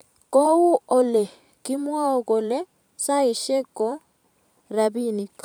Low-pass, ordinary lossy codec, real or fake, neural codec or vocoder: none; none; fake; vocoder, 44.1 kHz, 128 mel bands every 512 samples, BigVGAN v2